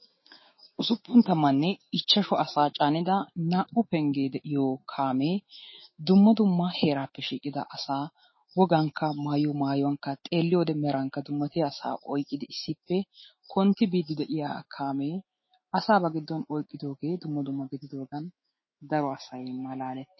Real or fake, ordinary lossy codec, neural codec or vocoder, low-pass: fake; MP3, 24 kbps; autoencoder, 48 kHz, 128 numbers a frame, DAC-VAE, trained on Japanese speech; 7.2 kHz